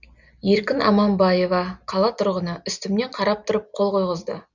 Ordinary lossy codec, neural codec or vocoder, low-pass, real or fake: none; none; 7.2 kHz; real